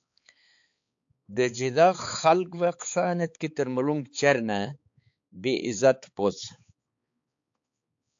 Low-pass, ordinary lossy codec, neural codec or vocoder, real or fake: 7.2 kHz; AAC, 64 kbps; codec, 16 kHz, 4 kbps, X-Codec, HuBERT features, trained on balanced general audio; fake